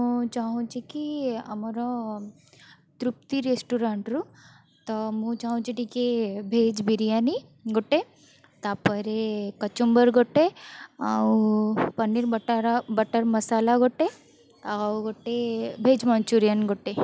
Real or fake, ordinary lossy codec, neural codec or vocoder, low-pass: real; none; none; none